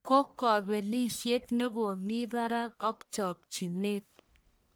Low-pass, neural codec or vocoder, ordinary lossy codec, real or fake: none; codec, 44.1 kHz, 1.7 kbps, Pupu-Codec; none; fake